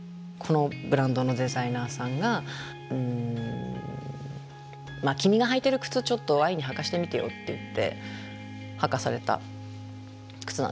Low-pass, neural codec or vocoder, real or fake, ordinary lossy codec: none; none; real; none